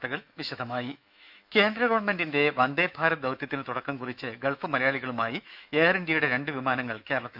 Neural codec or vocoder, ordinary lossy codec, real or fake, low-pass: autoencoder, 48 kHz, 128 numbers a frame, DAC-VAE, trained on Japanese speech; none; fake; 5.4 kHz